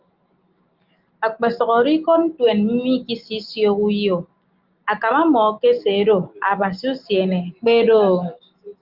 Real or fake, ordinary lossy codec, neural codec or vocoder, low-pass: real; Opus, 32 kbps; none; 5.4 kHz